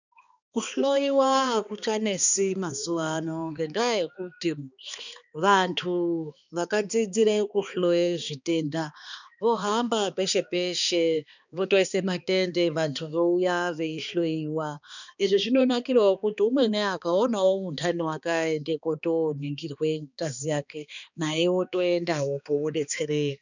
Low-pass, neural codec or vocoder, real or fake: 7.2 kHz; codec, 16 kHz, 2 kbps, X-Codec, HuBERT features, trained on balanced general audio; fake